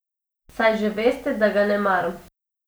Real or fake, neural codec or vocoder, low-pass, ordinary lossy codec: real; none; none; none